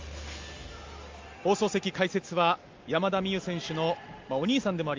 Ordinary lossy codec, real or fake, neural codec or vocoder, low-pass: Opus, 32 kbps; real; none; 7.2 kHz